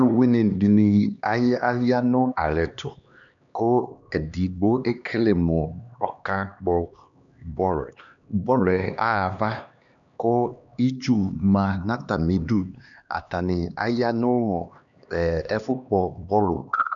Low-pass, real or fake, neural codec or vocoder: 7.2 kHz; fake; codec, 16 kHz, 2 kbps, X-Codec, HuBERT features, trained on LibriSpeech